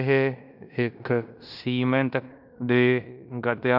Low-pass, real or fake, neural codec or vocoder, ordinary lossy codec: 5.4 kHz; fake; codec, 16 kHz in and 24 kHz out, 0.9 kbps, LongCat-Audio-Codec, fine tuned four codebook decoder; none